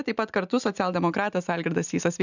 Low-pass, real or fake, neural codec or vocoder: 7.2 kHz; real; none